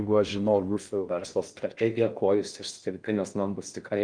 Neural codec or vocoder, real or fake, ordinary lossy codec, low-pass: codec, 16 kHz in and 24 kHz out, 0.6 kbps, FocalCodec, streaming, 4096 codes; fake; Opus, 32 kbps; 9.9 kHz